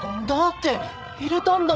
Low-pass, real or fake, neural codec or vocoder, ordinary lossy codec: none; fake; codec, 16 kHz, 16 kbps, FreqCodec, larger model; none